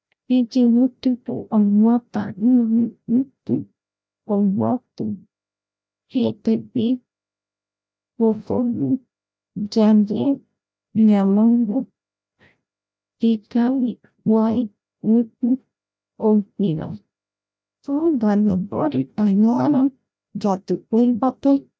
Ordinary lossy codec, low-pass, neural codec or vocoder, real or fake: none; none; codec, 16 kHz, 0.5 kbps, FreqCodec, larger model; fake